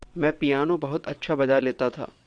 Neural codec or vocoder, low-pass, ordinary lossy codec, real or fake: vocoder, 22.05 kHz, 80 mel bands, WaveNeXt; 9.9 kHz; Opus, 64 kbps; fake